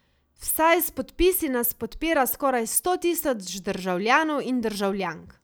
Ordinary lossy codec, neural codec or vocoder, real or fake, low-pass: none; none; real; none